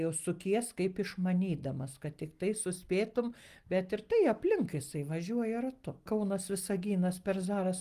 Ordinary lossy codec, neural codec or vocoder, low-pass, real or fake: Opus, 24 kbps; none; 14.4 kHz; real